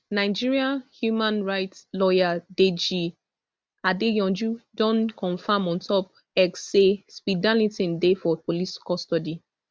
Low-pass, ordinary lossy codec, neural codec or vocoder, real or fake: none; none; none; real